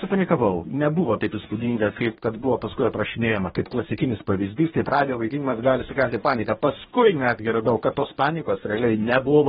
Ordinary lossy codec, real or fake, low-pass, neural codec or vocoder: AAC, 16 kbps; fake; 19.8 kHz; codec, 44.1 kHz, 2.6 kbps, DAC